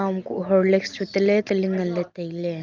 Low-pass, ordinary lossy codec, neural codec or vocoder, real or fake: 7.2 kHz; Opus, 32 kbps; none; real